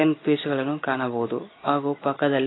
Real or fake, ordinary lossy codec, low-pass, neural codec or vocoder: real; AAC, 16 kbps; 7.2 kHz; none